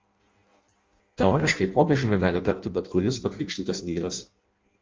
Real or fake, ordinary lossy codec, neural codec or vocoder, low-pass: fake; Opus, 32 kbps; codec, 16 kHz in and 24 kHz out, 0.6 kbps, FireRedTTS-2 codec; 7.2 kHz